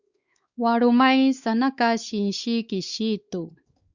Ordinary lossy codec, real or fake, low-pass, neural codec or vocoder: Opus, 64 kbps; fake; 7.2 kHz; codec, 16 kHz, 4 kbps, X-Codec, WavLM features, trained on Multilingual LibriSpeech